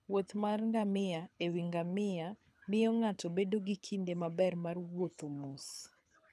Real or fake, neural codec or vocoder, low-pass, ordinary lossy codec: fake; codec, 24 kHz, 6 kbps, HILCodec; none; none